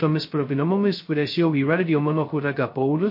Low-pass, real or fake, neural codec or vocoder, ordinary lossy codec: 5.4 kHz; fake; codec, 16 kHz, 0.2 kbps, FocalCodec; MP3, 32 kbps